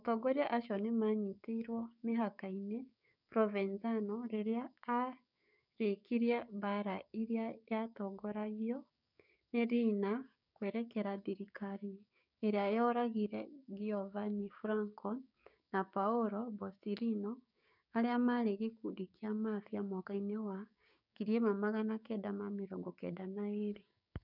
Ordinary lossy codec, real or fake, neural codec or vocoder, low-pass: none; fake; codec, 16 kHz, 6 kbps, DAC; 5.4 kHz